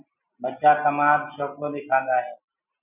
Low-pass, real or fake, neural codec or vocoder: 3.6 kHz; real; none